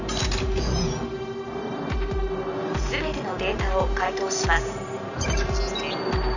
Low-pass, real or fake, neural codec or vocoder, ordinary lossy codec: 7.2 kHz; real; none; none